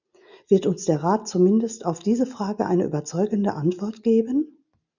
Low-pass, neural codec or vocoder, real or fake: 7.2 kHz; none; real